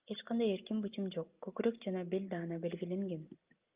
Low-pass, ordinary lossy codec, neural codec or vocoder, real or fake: 3.6 kHz; Opus, 24 kbps; none; real